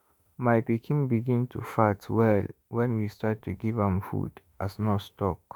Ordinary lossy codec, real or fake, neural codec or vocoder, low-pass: none; fake; autoencoder, 48 kHz, 32 numbers a frame, DAC-VAE, trained on Japanese speech; none